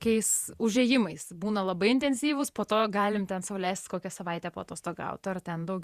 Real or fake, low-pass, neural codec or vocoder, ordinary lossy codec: fake; 14.4 kHz; vocoder, 48 kHz, 128 mel bands, Vocos; Opus, 64 kbps